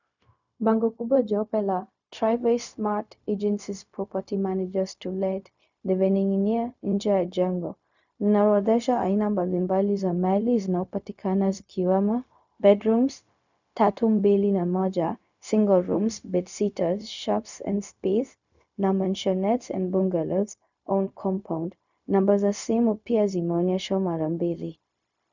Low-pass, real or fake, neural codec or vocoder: 7.2 kHz; fake; codec, 16 kHz, 0.4 kbps, LongCat-Audio-Codec